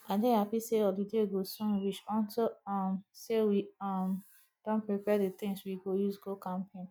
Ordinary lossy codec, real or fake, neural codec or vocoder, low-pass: none; real; none; none